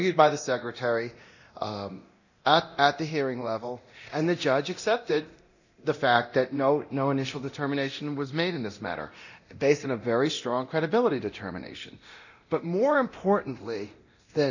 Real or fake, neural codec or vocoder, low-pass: fake; codec, 24 kHz, 0.9 kbps, DualCodec; 7.2 kHz